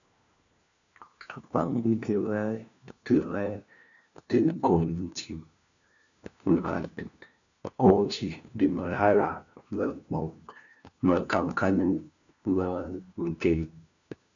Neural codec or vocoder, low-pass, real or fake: codec, 16 kHz, 1 kbps, FunCodec, trained on LibriTTS, 50 frames a second; 7.2 kHz; fake